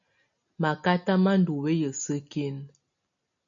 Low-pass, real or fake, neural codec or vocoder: 7.2 kHz; real; none